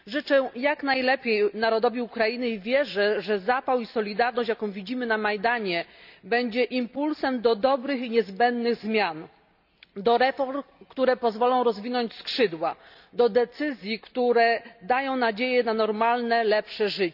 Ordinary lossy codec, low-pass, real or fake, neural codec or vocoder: none; 5.4 kHz; real; none